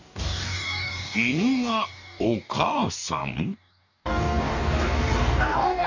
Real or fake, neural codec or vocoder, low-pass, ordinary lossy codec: fake; codec, 44.1 kHz, 2.6 kbps, DAC; 7.2 kHz; none